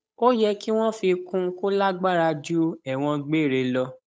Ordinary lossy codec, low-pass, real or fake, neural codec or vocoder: none; none; fake; codec, 16 kHz, 8 kbps, FunCodec, trained on Chinese and English, 25 frames a second